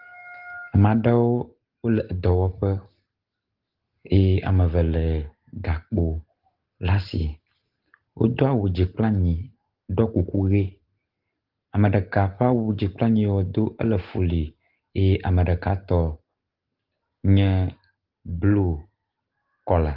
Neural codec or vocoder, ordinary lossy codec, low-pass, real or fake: none; Opus, 16 kbps; 5.4 kHz; real